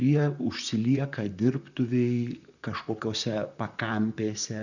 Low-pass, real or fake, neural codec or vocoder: 7.2 kHz; fake; vocoder, 44.1 kHz, 128 mel bands, Pupu-Vocoder